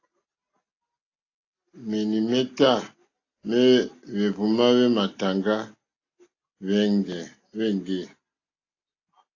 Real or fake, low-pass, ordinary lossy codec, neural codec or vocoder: real; 7.2 kHz; AAC, 32 kbps; none